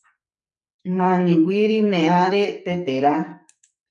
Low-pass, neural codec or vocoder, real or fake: 10.8 kHz; codec, 44.1 kHz, 2.6 kbps, SNAC; fake